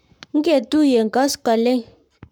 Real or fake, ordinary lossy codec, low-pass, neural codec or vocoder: fake; none; 19.8 kHz; codec, 44.1 kHz, 7.8 kbps, Pupu-Codec